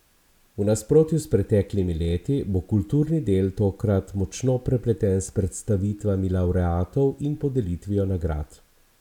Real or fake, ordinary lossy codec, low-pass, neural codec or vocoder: real; none; 19.8 kHz; none